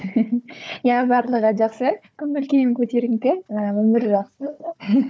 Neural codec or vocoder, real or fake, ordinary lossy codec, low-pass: codec, 16 kHz, 4 kbps, FunCodec, trained on Chinese and English, 50 frames a second; fake; none; none